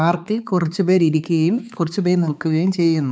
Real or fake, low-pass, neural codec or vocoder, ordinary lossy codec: fake; none; codec, 16 kHz, 4 kbps, X-Codec, HuBERT features, trained on balanced general audio; none